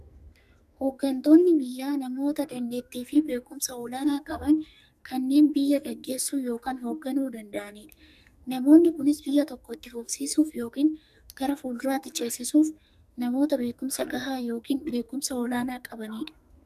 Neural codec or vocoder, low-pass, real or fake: codec, 44.1 kHz, 2.6 kbps, SNAC; 14.4 kHz; fake